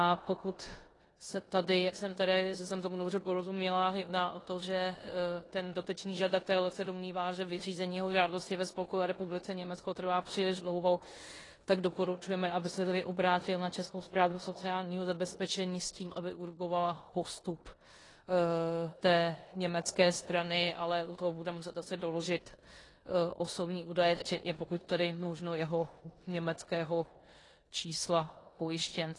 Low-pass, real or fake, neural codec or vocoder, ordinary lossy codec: 10.8 kHz; fake; codec, 16 kHz in and 24 kHz out, 0.9 kbps, LongCat-Audio-Codec, four codebook decoder; AAC, 32 kbps